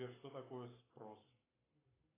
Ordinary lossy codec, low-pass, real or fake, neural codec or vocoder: AAC, 16 kbps; 3.6 kHz; fake; codec, 44.1 kHz, 7.8 kbps, Pupu-Codec